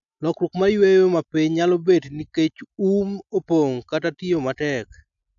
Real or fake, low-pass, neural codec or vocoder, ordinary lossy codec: real; 7.2 kHz; none; none